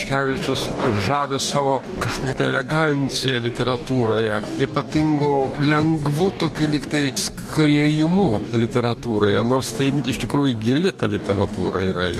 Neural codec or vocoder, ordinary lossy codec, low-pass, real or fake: codec, 44.1 kHz, 2.6 kbps, DAC; MP3, 64 kbps; 14.4 kHz; fake